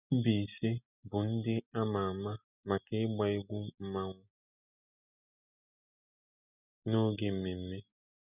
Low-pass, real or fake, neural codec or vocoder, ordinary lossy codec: 3.6 kHz; real; none; none